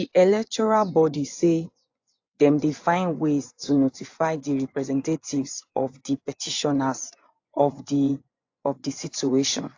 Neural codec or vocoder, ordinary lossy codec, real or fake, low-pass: vocoder, 24 kHz, 100 mel bands, Vocos; AAC, 48 kbps; fake; 7.2 kHz